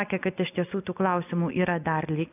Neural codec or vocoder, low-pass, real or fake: none; 3.6 kHz; real